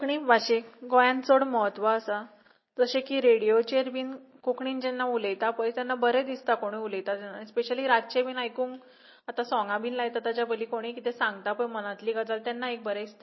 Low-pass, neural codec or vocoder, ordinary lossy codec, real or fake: 7.2 kHz; none; MP3, 24 kbps; real